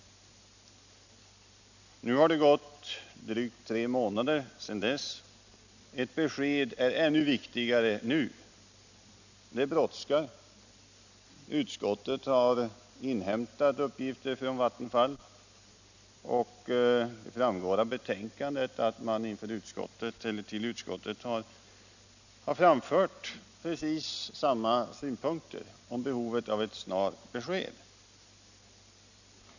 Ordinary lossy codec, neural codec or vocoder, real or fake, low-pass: none; none; real; 7.2 kHz